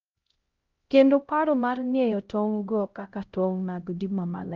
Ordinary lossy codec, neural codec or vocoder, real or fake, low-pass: Opus, 32 kbps; codec, 16 kHz, 0.5 kbps, X-Codec, HuBERT features, trained on LibriSpeech; fake; 7.2 kHz